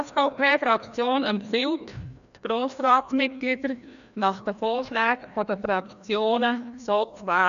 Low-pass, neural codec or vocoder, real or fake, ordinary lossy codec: 7.2 kHz; codec, 16 kHz, 1 kbps, FreqCodec, larger model; fake; none